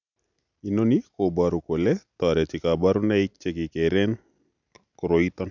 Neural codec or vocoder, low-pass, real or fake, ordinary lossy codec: none; 7.2 kHz; real; none